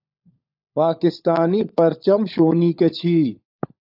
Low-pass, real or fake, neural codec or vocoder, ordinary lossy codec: 5.4 kHz; fake; codec, 16 kHz, 16 kbps, FunCodec, trained on LibriTTS, 50 frames a second; AAC, 48 kbps